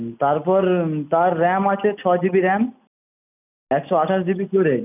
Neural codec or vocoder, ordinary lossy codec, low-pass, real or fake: none; none; 3.6 kHz; real